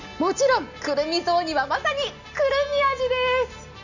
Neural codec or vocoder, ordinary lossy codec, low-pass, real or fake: none; none; 7.2 kHz; real